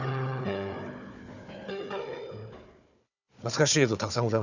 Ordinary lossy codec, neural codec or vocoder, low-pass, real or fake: none; codec, 16 kHz, 4 kbps, FunCodec, trained on Chinese and English, 50 frames a second; 7.2 kHz; fake